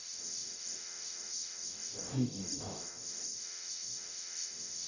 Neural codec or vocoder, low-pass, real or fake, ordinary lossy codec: codec, 44.1 kHz, 0.9 kbps, DAC; 7.2 kHz; fake; none